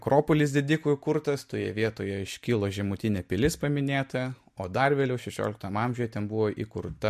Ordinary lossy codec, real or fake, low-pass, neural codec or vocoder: MP3, 64 kbps; real; 14.4 kHz; none